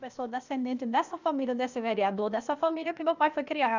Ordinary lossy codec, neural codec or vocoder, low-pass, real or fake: none; codec, 16 kHz, 0.8 kbps, ZipCodec; 7.2 kHz; fake